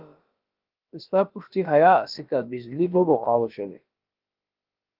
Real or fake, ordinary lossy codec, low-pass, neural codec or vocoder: fake; Opus, 32 kbps; 5.4 kHz; codec, 16 kHz, about 1 kbps, DyCAST, with the encoder's durations